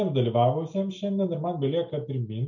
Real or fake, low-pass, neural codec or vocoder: real; 7.2 kHz; none